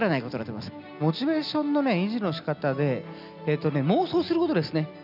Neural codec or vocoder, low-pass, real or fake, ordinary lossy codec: none; 5.4 kHz; real; none